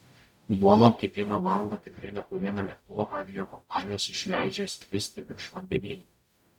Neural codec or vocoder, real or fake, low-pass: codec, 44.1 kHz, 0.9 kbps, DAC; fake; 19.8 kHz